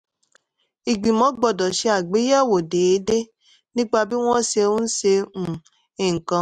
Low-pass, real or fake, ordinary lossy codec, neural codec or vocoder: none; real; none; none